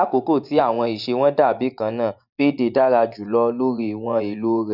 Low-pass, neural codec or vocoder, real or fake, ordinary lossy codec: 5.4 kHz; vocoder, 24 kHz, 100 mel bands, Vocos; fake; none